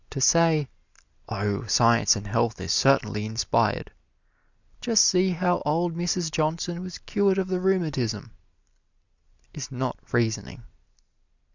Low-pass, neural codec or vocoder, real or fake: 7.2 kHz; none; real